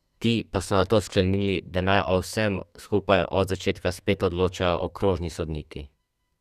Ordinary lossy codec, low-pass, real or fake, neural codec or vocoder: none; 14.4 kHz; fake; codec, 32 kHz, 1.9 kbps, SNAC